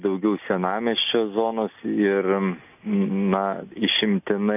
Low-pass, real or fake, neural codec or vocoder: 3.6 kHz; real; none